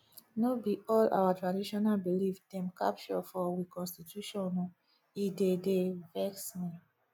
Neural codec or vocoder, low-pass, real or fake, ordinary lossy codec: none; none; real; none